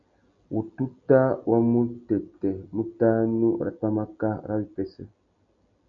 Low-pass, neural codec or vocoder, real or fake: 7.2 kHz; none; real